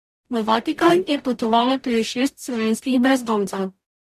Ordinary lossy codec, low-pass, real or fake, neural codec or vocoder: MP3, 64 kbps; 14.4 kHz; fake; codec, 44.1 kHz, 0.9 kbps, DAC